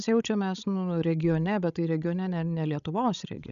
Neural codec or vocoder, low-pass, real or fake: codec, 16 kHz, 16 kbps, FreqCodec, larger model; 7.2 kHz; fake